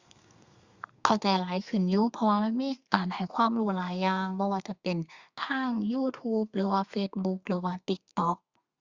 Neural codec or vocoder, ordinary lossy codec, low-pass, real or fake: codec, 32 kHz, 1.9 kbps, SNAC; Opus, 64 kbps; 7.2 kHz; fake